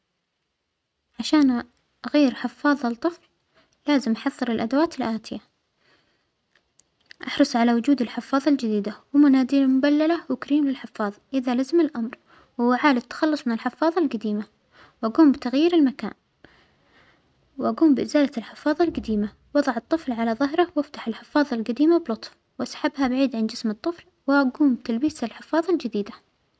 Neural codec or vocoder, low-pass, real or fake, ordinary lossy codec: none; none; real; none